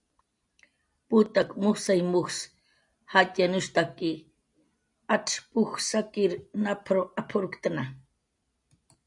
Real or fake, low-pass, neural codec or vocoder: real; 10.8 kHz; none